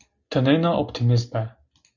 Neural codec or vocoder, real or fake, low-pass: none; real; 7.2 kHz